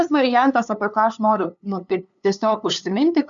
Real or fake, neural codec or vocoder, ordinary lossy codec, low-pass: fake; codec, 16 kHz, 2 kbps, FunCodec, trained on LibriTTS, 25 frames a second; MP3, 96 kbps; 7.2 kHz